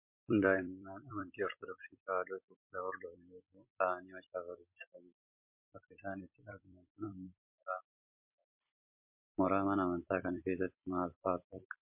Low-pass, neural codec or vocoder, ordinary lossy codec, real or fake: 3.6 kHz; vocoder, 44.1 kHz, 128 mel bands every 256 samples, BigVGAN v2; MP3, 32 kbps; fake